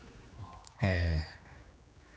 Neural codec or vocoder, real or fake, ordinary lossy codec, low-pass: codec, 16 kHz, 2 kbps, X-Codec, HuBERT features, trained on general audio; fake; none; none